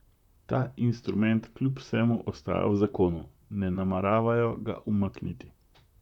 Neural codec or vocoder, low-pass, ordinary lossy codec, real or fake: vocoder, 44.1 kHz, 128 mel bands, Pupu-Vocoder; 19.8 kHz; none; fake